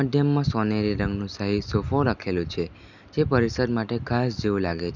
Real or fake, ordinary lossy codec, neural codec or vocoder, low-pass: real; none; none; 7.2 kHz